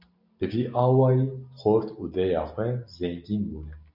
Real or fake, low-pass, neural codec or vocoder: real; 5.4 kHz; none